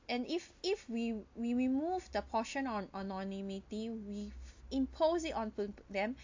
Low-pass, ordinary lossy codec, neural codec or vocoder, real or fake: 7.2 kHz; AAC, 48 kbps; none; real